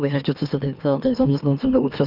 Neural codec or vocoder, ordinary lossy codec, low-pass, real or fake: autoencoder, 44.1 kHz, a latent of 192 numbers a frame, MeloTTS; Opus, 24 kbps; 5.4 kHz; fake